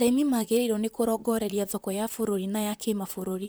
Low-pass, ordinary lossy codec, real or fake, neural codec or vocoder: none; none; fake; vocoder, 44.1 kHz, 128 mel bands, Pupu-Vocoder